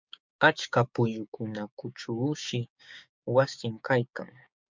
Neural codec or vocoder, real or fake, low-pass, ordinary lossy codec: codec, 16 kHz, 6 kbps, DAC; fake; 7.2 kHz; MP3, 64 kbps